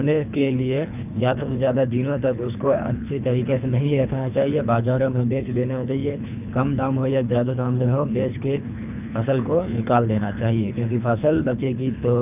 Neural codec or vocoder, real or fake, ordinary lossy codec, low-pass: codec, 24 kHz, 3 kbps, HILCodec; fake; none; 3.6 kHz